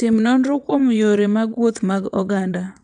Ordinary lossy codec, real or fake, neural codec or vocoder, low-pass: none; fake; vocoder, 22.05 kHz, 80 mel bands, WaveNeXt; 9.9 kHz